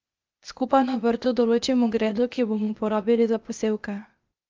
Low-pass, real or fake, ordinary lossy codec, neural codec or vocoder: 7.2 kHz; fake; Opus, 24 kbps; codec, 16 kHz, 0.8 kbps, ZipCodec